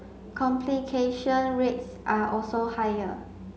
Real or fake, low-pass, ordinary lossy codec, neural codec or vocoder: real; none; none; none